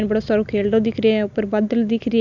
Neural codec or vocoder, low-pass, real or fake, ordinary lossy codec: none; 7.2 kHz; real; none